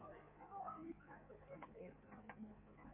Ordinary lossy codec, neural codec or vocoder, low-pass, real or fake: MP3, 24 kbps; codec, 16 kHz in and 24 kHz out, 1.1 kbps, FireRedTTS-2 codec; 3.6 kHz; fake